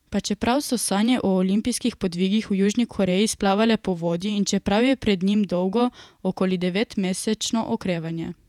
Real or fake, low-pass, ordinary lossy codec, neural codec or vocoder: fake; 19.8 kHz; none; vocoder, 48 kHz, 128 mel bands, Vocos